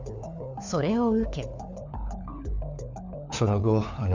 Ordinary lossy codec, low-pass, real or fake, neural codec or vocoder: none; 7.2 kHz; fake; codec, 16 kHz, 4 kbps, FunCodec, trained on LibriTTS, 50 frames a second